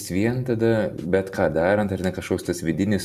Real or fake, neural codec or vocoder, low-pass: fake; vocoder, 44.1 kHz, 128 mel bands every 256 samples, BigVGAN v2; 14.4 kHz